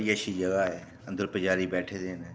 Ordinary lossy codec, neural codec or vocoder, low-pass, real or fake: none; none; none; real